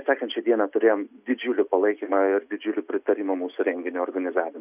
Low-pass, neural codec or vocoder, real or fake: 3.6 kHz; none; real